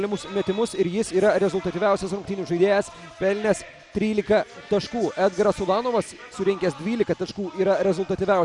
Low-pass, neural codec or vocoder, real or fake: 10.8 kHz; none; real